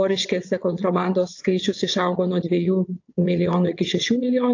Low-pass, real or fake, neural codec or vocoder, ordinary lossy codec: 7.2 kHz; fake; vocoder, 22.05 kHz, 80 mel bands, WaveNeXt; AAC, 48 kbps